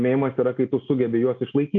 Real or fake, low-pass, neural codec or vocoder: real; 7.2 kHz; none